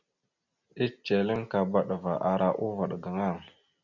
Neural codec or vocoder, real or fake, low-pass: none; real; 7.2 kHz